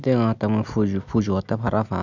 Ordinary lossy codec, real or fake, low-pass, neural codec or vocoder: none; real; 7.2 kHz; none